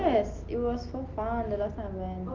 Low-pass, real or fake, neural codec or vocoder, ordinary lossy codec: 7.2 kHz; real; none; Opus, 24 kbps